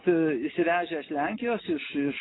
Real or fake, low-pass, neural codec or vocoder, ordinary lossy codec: real; 7.2 kHz; none; AAC, 16 kbps